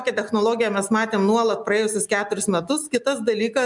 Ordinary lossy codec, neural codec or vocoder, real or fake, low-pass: MP3, 96 kbps; none; real; 10.8 kHz